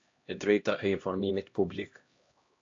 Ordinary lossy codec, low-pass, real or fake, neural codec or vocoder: AAC, 48 kbps; 7.2 kHz; fake; codec, 16 kHz, 1 kbps, X-Codec, HuBERT features, trained on LibriSpeech